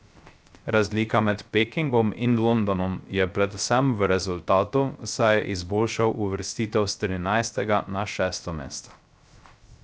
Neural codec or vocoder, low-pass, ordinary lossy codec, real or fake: codec, 16 kHz, 0.3 kbps, FocalCodec; none; none; fake